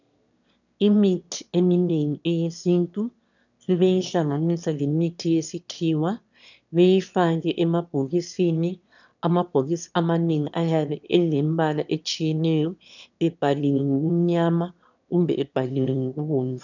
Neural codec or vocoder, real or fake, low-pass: autoencoder, 22.05 kHz, a latent of 192 numbers a frame, VITS, trained on one speaker; fake; 7.2 kHz